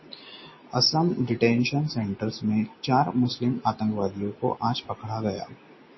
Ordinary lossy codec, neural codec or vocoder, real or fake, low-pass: MP3, 24 kbps; none; real; 7.2 kHz